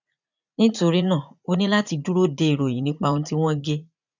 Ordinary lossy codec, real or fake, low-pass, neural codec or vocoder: none; fake; 7.2 kHz; vocoder, 44.1 kHz, 80 mel bands, Vocos